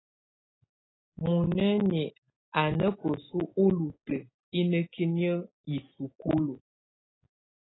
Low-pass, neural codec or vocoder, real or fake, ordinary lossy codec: 7.2 kHz; none; real; AAC, 16 kbps